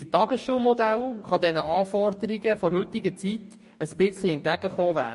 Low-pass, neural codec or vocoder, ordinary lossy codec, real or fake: 14.4 kHz; codec, 44.1 kHz, 2.6 kbps, DAC; MP3, 48 kbps; fake